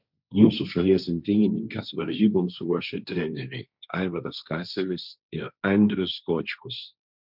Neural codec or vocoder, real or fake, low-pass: codec, 16 kHz, 1.1 kbps, Voila-Tokenizer; fake; 5.4 kHz